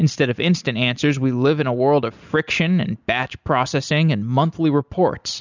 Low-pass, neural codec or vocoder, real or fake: 7.2 kHz; none; real